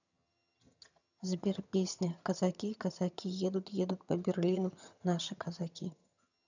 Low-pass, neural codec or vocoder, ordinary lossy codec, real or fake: 7.2 kHz; vocoder, 22.05 kHz, 80 mel bands, HiFi-GAN; none; fake